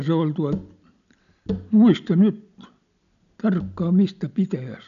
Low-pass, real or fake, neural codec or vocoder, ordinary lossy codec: 7.2 kHz; real; none; none